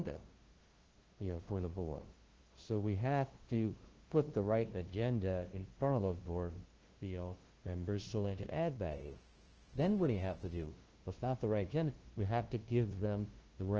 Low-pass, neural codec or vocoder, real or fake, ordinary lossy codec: 7.2 kHz; codec, 16 kHz, 0.5 kbps, FunCodec, trained on LibriTTS, 25 frames a second; fake; Opus, 16 kbps